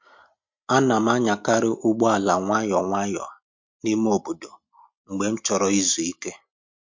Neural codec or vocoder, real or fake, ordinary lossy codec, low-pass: none; real; MP3, 48 kbps; 7.2 kHz